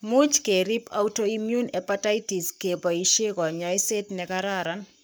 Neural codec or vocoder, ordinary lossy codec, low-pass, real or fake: codec, 44.1 kHz, 7.8 kbps, Pupu-Codec; none; none; fake